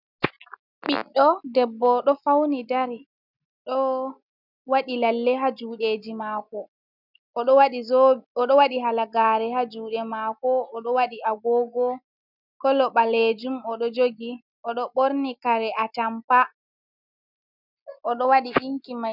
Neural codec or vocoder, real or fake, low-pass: none; real; 5.4 kHz